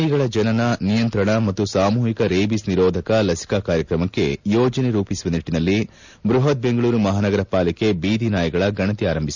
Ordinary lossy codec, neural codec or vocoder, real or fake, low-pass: none; none; real; 7.2 kHz